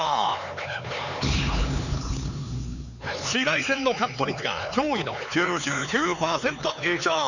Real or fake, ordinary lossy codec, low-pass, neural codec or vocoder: fake; none; 7.2 kHz; codec, 16 kHz, 4 kbps, X-Codec, HuBERT features, trained on LibriSpeech